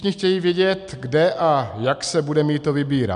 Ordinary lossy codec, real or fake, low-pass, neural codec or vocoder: MP3, 96 kbps; real; 10.8 kHz; none